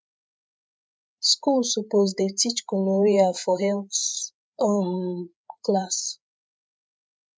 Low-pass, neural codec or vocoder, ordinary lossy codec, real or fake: none; codec, 16 kHz, 8 kbps, FreqCodec, larger model; none; fake